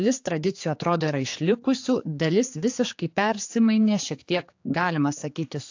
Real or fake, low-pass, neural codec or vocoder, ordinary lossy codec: fake; 7.2 kHz; codec, 16 kHz, 4 kbps, X-Codec, HuBERT features, trained on general audio; AAC, 48 kbps